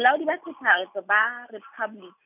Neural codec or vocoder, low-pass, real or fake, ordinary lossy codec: none; 3.6 kHz; real; none